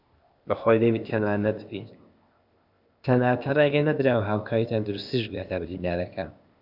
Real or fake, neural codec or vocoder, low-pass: fake; codec, 16 kHz, 0.8 kbps, ZipCodec; 5.4 kHz